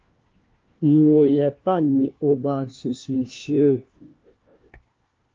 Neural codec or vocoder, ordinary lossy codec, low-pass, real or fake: codec, 16 kHz, 1 kbps, FunCodec, trained on LibriTTS, 50 frames a second; Opus, 24 kbps; 7.2 kHz; fake